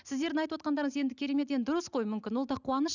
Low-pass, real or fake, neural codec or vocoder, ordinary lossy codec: 7.2 kHz; real; none; none